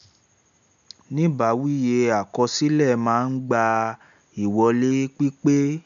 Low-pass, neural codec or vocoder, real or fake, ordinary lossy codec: 7.2 kHz; none; real; none